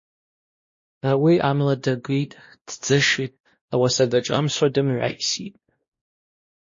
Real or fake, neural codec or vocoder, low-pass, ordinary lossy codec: fake; codec, 16 kHz, 1 kbps, X-Codec, WavLM features, trained on Multilingual LibriSpeech; 7.2 kHz; MP3, 32 kbps